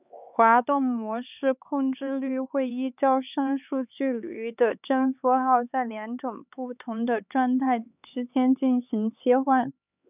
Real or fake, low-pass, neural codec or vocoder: fake; 3.6 kHz; codec, 16 kHz, 4 kbps, X-Codec, HuBERT features, trained on LibriSpeech